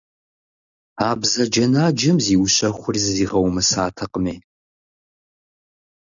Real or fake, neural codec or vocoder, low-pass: real; none; 7.2 kHz